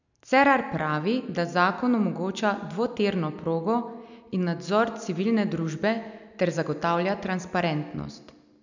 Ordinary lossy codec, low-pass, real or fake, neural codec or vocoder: none; 7.2 kHz; real; none